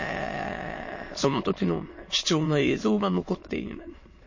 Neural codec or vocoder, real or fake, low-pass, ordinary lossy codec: autoencoder, 22.05 kHz, a latent of 192 numbers a frame, VITS, trained on many speakers; fake; 7.2 kHz; MP3, 32 kbps